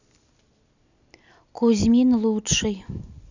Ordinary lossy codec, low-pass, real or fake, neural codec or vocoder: none; 7.2 kHz; real; none